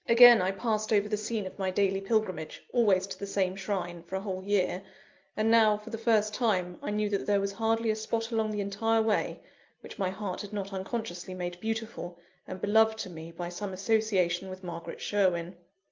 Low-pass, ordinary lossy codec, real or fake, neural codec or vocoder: 7.2 kHz; Opus, 24 kbps; real; none